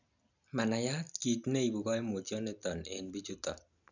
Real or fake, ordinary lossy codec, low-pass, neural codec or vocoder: real; none; 7.2 kHz; none